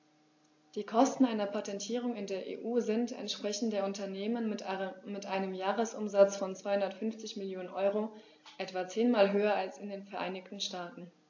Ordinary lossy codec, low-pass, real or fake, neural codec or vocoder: AAC, 48 kbps; 7.2 kHz; real; none